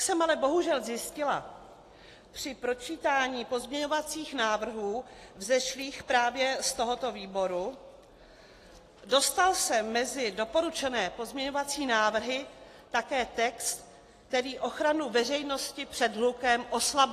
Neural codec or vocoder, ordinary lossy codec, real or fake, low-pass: none; AAC, 48 kbps; real; 14.4 kHz